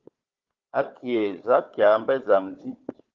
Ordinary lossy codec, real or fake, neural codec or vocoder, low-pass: Opus, 24 kbps; fake; codec, 16 kHz, 4 kbps, FunCodec, trained on Chinese and English, 50 frames a second; 7.2 kHz